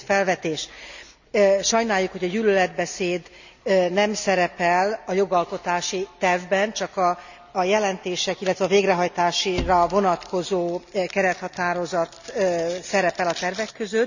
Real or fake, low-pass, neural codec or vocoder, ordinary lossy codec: real; 7.2 kHz; none; none